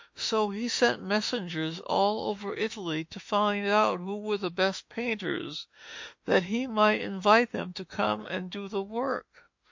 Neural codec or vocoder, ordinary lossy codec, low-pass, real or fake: autoencoder, 48 kHz, 32 numbers a frame, DAC-VAE, trained on Japanese speech; MP3, 48 kbps; 7.2 kHz; fake